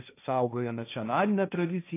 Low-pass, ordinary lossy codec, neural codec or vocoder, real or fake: 3.6 kHz; AAC, 24 kbps; codec, 16 kHz, 1.1 kbps, Voila-Tokenizer; fake